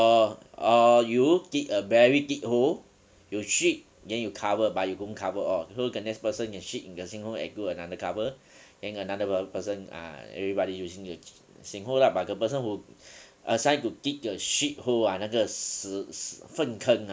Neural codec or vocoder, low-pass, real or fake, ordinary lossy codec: none; none; real; none